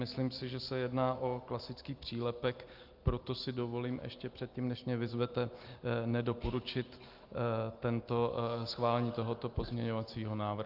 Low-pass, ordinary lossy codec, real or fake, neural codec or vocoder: 5.4 kHz; Opus, 32 kbps; real; none